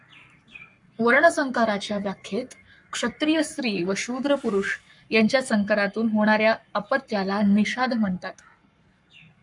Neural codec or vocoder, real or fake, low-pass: codec, 44.1 kHz, 7.8 kbps, Pupu-Codec; fake; 10.8 kHz